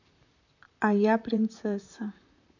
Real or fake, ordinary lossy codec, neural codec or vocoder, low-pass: fake; none; vocoder, 44.1 kHz, 128 mel bands, Pupu-Vocoder; 7.2 kHz